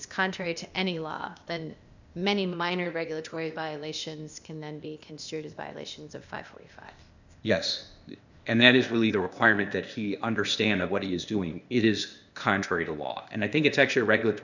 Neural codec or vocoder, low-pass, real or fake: codec, 16 kHz, 0.8 kbps, ZipCodec; 7.2 kHz; fake